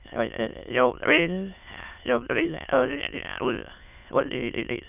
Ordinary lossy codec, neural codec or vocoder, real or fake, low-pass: none; autoencoder, 22.05 kHz, a latent of 192 numbers a frame, VITS, trained on many speakers; fake; 3.6 kHz